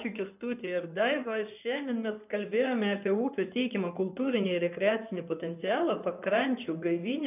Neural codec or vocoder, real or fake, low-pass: codec, 16 kHz in and 24 kHz out, 2.2 kbps, FireRedTTS-2 codec; fake; 3.6 kHz